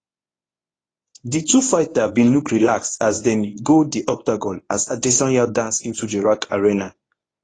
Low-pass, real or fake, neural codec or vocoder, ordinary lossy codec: 9.9 kHz; fake; codec, 24 kHz, 0.9 kbps, WavTokenizer, medium speech release version 1; AAC, 32 kbps